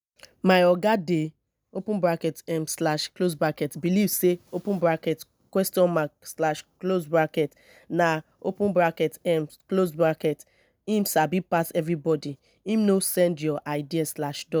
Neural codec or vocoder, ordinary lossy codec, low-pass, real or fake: none; none; none; real